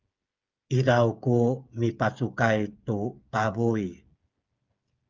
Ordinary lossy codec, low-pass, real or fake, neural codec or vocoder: Opus, 24 kbps; 7.2 kHz; fake; codec, 16 kHz, 8 kbps, FreqCodec, smaller model